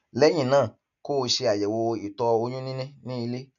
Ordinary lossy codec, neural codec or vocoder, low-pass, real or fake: none; none; 7.2 kHz; real